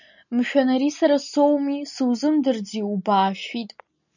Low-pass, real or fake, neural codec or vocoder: 7.2 kHz; real; none